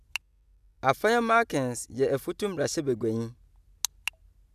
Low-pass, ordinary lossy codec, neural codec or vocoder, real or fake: 14.4 kHz; none; none; real